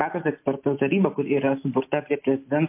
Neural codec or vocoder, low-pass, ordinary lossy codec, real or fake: none; 3.6 kHz; MP3, 24 kbps; real